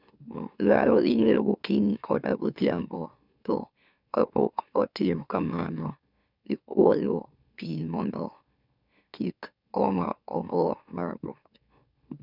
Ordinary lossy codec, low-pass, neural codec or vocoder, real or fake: none; 5.4 kHz; autoencoder, 44.1 kHz, a latent of 192 numbers a frame, MeloTTS; fake